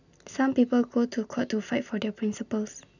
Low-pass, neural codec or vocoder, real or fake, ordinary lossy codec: 7.2 kHz; none; real; none